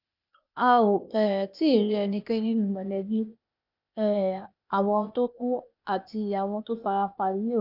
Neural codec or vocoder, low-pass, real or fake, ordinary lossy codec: codec, 16 kHz, 0.8 kbps, ZipCodec; 5.4 kHz; fake; none